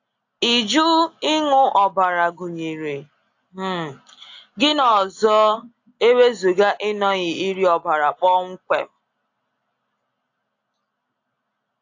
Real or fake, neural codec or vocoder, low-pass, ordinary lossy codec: real; none; 7.2 kHz; AAC, 48 kbps